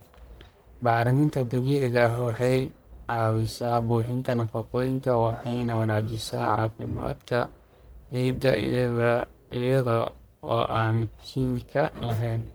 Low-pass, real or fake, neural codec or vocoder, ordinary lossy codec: none; fake; codec, 44.1 kHz, 1.7 kbps, Pupu-Codec; none